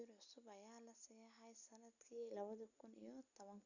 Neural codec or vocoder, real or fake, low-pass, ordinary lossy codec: none; real; 7.2 kHz; none